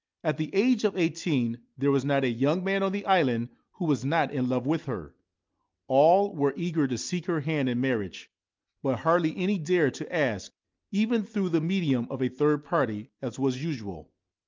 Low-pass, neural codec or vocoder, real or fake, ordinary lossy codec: 7.2 kHz; none; real; Opus, 24 kbps